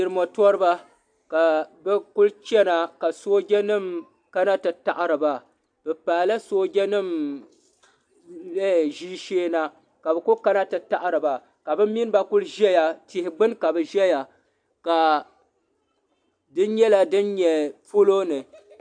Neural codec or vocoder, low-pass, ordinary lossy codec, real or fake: none; 9.9 kHz; AAC, 64 kbps; real